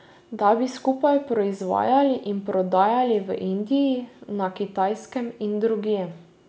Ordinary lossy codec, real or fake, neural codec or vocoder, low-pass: none; real; none; none